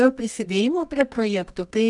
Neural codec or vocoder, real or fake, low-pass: codec, 24 kHz, 0.9 kbps, WavTokenizer, medium music audio release; fake; 10.8 kHz